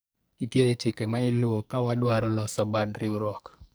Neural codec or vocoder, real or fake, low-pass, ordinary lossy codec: codec, 44.1 kHz, 2.6 kbps, SNAC; fake; none; none